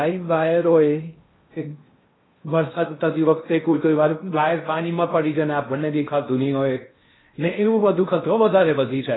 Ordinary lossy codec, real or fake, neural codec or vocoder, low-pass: AAC, 16 kbps; fake; codec, 16 kHz in and 24 kHz out, 0.6 kbps, FocalCodec, streaming, 2048 codes; 7.2 kHz